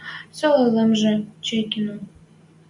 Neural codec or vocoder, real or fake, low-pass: none; real; 10.8 kHz